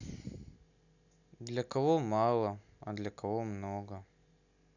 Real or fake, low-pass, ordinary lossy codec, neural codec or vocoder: real; 7.2 kHz; none; none